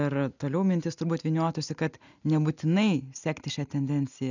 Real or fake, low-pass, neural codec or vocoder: real; 7.2 kHz; none